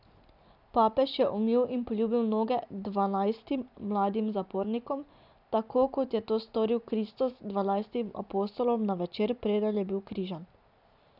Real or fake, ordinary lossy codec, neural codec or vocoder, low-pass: real; none; none; 5.4 kHz